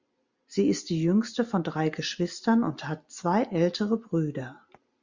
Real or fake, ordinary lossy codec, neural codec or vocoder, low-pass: real; Opus, 64 kbps; none; 7.2 kHz